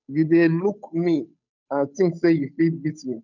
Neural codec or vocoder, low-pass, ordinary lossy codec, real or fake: codec, 16 kHz, 8 kbps, FunCodec, trained on Chinese and English, 25 frames a second; 7.2 kHz; none; fake